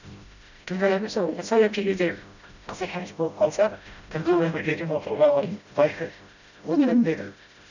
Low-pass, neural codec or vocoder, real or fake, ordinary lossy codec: 7.2 kHz; codec, 16 kHz, 0.5 kbps, FreqCodec, smaller model; fake; none